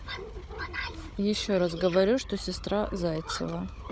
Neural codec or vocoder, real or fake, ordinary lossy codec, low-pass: codec, 16 kHz, 16 kbps, FunCodec, trained on Chinese and English, 50 frames a second; fake; none; none